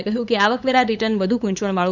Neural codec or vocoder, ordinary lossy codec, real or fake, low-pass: codec, 16 kHz, 8 kbps, FunCodec, trained on LibriTTS, 25 frames a second; none; fake; 7.2 kHz